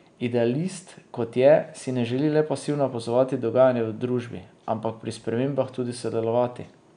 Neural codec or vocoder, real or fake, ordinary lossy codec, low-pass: none; real; none; 9.9 kHz